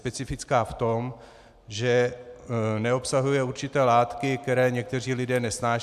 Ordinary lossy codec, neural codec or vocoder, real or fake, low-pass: MP3, 96 kbps; none; real; 14.4 kHz